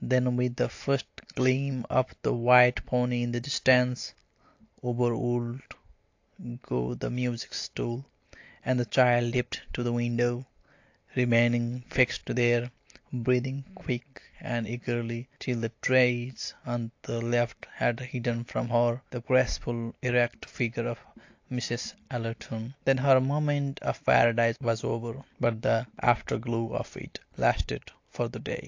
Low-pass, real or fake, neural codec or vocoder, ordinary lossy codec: 7.2 kHz; real; none; AAC, 48 kbps